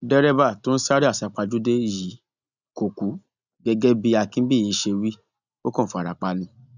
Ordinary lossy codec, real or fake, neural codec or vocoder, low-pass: none; real; none; 7.2 kHz